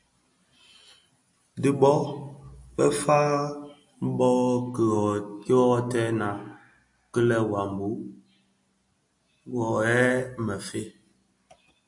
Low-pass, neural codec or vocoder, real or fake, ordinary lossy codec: 10.8 kHz; none; real; AAC, 64 kbps